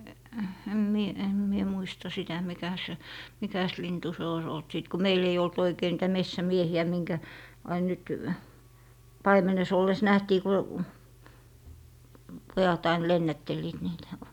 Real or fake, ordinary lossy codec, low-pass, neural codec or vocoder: real; none; 19.8 kHz; none